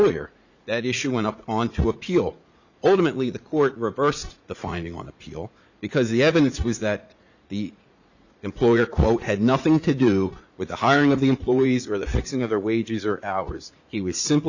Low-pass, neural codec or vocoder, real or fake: 7.2 kHz; vocoder, 44.1 kHz, 80 mel bands, Vocos; fake